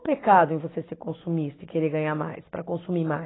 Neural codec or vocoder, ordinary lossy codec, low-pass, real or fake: none; AAC, 16 kbps; 7.2 kHz; real